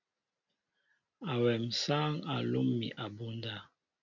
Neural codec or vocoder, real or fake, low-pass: none; real; 7.2 kHz